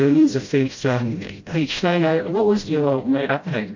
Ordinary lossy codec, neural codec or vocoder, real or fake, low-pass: MP3, 48 kbps; codec, 16 kHz, 0.5 kbps, FreqCodec, smaller model; fake; 7.2 kHz